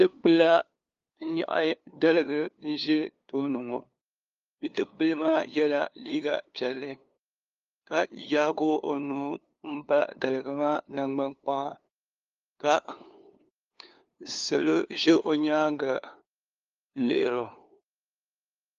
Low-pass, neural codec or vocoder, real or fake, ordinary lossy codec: 7.2 kHz; codec, 16 kHz, 2 kbps, FunCodec, trained on LibriTTS, 25 frames a second; fake; Opus, 32 kbps